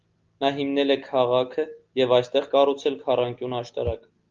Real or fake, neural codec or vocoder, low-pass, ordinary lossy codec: real; none; 7.2 kHz; Opus, 32 kbps